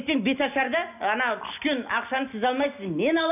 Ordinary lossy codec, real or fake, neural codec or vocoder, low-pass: none; real; none; 3.6 kHz